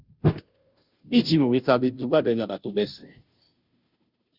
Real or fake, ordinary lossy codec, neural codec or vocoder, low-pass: fake; Opus, 64 kbps; codec, 16 kHz, 0.5 kbps, FunCodec, trained on Chinese and English, 25 frames a second; 5.4 kHz